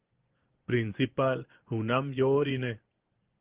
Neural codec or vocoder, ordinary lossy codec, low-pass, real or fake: vocoder, 24 kHz, 100 mel bands, Vocos; Opus, 16 kbps; 3.6 kHz; fake